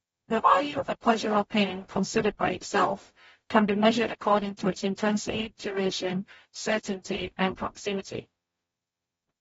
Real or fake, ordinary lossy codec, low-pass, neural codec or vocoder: fake; AAC, 24 kbps; 19.8 kHz; codec, 44.1 kHz, 0.9 kbps, DAC